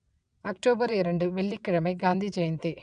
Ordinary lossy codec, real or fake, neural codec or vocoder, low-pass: none; fake; vocoder, 22.05 kHz, 80 mel bands, WaveNeXt; 9.9 kHz